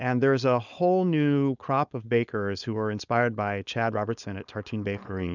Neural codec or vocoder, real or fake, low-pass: codec, 16 kHz, 4.8 kbps, FACodec; fake; 7.2 kHz